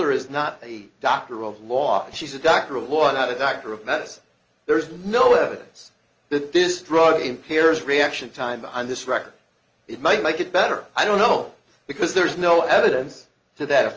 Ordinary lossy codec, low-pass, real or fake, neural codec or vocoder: Opus, 24 kbps; 7.2 kHz; real; none